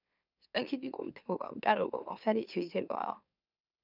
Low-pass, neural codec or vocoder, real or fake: 5.4 kHz; autoencoder, 44.1 kHz, a latent of 192 numbers a frame, MeloTTS; fake